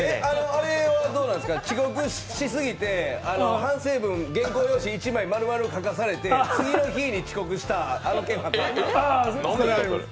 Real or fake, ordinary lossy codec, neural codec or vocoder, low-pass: real; none; none; none